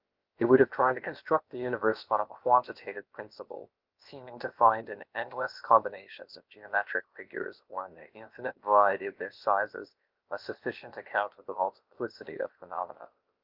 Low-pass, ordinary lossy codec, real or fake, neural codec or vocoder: 5.4 kHz; Opus, 24 kbps; fake; codec, 16 kHz, about 1 kbps, DyCAST, with the encoder's durations